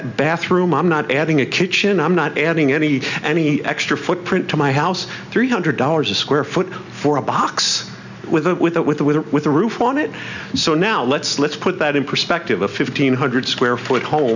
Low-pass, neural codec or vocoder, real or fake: 7.2 kHz; none; real